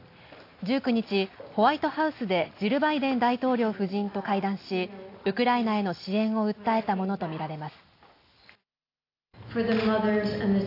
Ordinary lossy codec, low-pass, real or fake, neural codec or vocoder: AAC, 32 kbps; 5.4 kHz; real; none